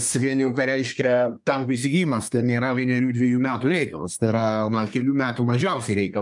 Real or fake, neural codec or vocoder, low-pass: fake; codec, 24 kHz, 1 kbps, SNAC; 10.8 kHz